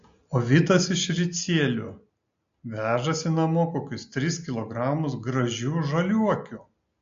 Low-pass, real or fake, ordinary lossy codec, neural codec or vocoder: 7.2 kHz; real; MP3, 48 kbps; none